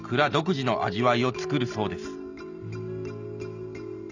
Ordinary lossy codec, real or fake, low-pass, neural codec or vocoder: none; real; 7.2 kHz; none